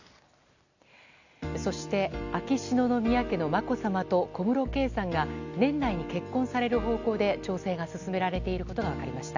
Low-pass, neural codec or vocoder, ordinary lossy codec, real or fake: 7.2 kHz; none; none; real